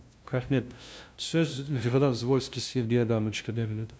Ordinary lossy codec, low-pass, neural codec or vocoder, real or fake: none; none; codec, 16 kHz, 0.5 kbps, FunCodec, trained on LibriTTS, 25 frames a second; fake